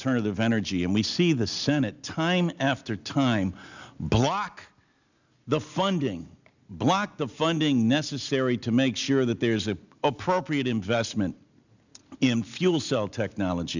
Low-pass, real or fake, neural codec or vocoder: 7.2 kHz; real; none